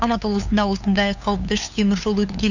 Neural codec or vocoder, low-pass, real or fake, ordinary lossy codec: codec, 16 kHz, 2 kbps, FunCodec, trained on LibriTTS, 25 frames a second; 7.2 kHz; fake; none